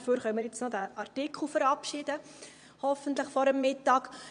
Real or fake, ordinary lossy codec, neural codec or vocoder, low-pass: fake; none; vocoder, 22.05 kHz, 80 mel bands, Vocos; 9.9 kHz